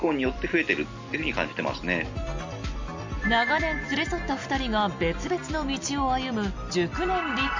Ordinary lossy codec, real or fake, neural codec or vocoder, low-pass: MP3, 64 kbps; real; none; 7.2 kHz